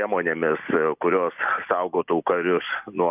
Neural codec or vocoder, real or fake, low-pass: none; real; 3.6 kHz